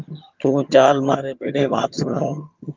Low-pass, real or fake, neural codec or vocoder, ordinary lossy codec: 7.2 kHz; fake; vocoder, 22.05 kHz, 80 mel bands, HiFi-GAN; Opus, 24 kbps